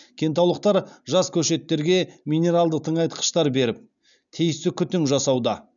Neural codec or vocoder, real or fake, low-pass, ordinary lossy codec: none; real; 7.2 kHz; none